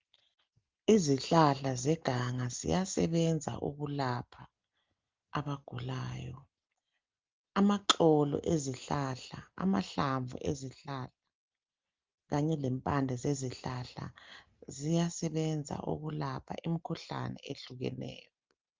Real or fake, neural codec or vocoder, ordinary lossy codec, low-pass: real; none; Opus, 32 kbps; 7.2 kHz